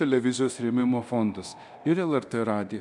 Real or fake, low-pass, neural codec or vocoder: fake; 10.8 kHz; codec, 24 kHz, 0.9 kbps, DualCodec